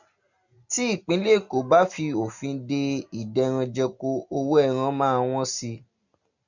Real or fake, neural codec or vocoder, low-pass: real; none; 7.2 kHz